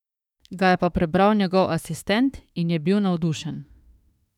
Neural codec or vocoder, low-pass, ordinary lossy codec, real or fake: autoencoder, 48 kHz, 32 numbers a frame, DAC-VAE, trained on Japanese speech; 19.8 kHz; none; fake